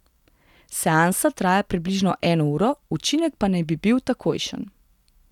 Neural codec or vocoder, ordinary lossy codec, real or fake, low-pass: none; none; real; 19.8 kHz